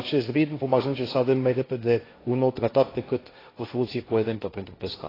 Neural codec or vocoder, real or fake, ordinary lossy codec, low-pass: codec, 16 kHz, 1.1 kbps, Voila-Tokenizer; fake; AAC, 24 kbps; 5.4 kHz